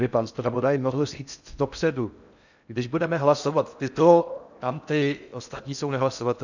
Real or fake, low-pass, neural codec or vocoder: fake; 7.2 kHz; codec, 16 kHz in and 24 kHz out, 0.6 kbps, FocalCodec, streaming, 4096 codes